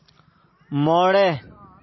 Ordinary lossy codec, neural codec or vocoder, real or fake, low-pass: MP3, 24 kbps; none; real; 7.2 kHz